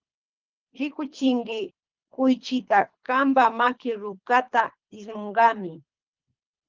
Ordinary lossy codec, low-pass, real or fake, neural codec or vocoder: Opus, 32 kbps; 7.2 kHz; fake; codec, 24 kHz, 3 kbps, HILCodec